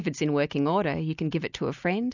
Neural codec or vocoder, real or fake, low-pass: none; real; 7.2 kHz